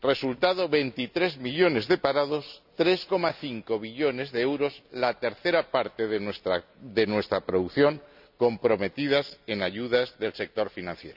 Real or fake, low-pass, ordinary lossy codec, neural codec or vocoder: real; 5.4 kHz; none; none